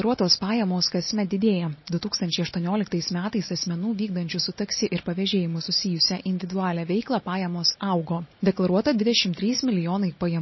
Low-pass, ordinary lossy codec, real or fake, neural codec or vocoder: 7.2 kHz; MP3, 24 kbps; real; none